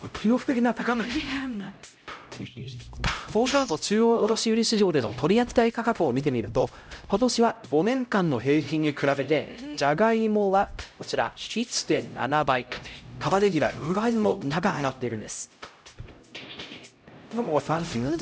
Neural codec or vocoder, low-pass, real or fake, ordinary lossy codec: codec, 16 kHz, 0.5 kbps, X-Codec, HuBERT features, trained on LibriSpeech; none; fake; none